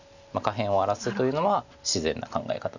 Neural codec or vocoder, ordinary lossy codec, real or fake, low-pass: none; none; real; 7.2 kHz